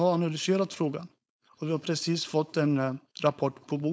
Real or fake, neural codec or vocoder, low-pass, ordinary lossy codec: fake; codec, 16 kHz, 4.8 kbps, FACodec; none; none